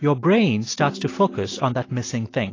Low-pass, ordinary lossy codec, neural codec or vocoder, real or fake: 7.2 kHz; AAC, 32 kbps; none; real